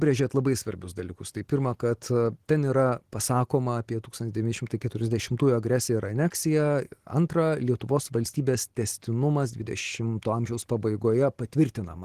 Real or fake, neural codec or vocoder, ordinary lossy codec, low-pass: real; none; Opus, 16 kbps; 14.4 kHz